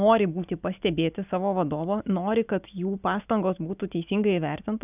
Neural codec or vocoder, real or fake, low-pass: none; real; 3.6 kHz